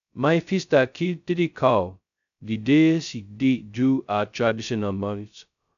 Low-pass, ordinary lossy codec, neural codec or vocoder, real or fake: 7.2 kHz; none; codec, 16 kHz, 0.2 kbps, FocalCodec; fake